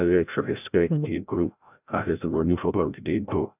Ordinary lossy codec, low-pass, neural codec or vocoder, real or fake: none; 3.6 kHz; codec, 16 kHz, 0.5 kbps, FreqCodec, larger model; fake